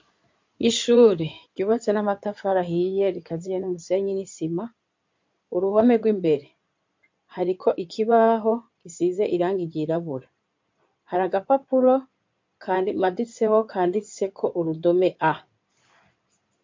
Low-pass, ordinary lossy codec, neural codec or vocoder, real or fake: 7.2 kHz; MP3, 48 kbps; codec, 16 kHz in and 24 kHz out, 2.2 kbps, FireRedTTS-2 codec; fake